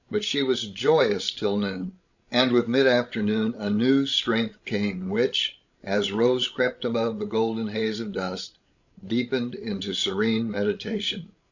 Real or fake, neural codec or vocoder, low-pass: fake; codec, 16 kHz, 16 kbps, FreqCodec, larger model; 7.2 kHz